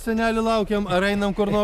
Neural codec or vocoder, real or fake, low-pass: none; real; 14.4 kHz